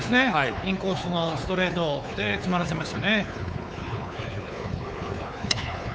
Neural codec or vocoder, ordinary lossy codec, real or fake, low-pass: codec, 16 kHz, 4 kbps, X-Codec, WavLM features, trained on Multilingual LibriSpeech; none; fake; none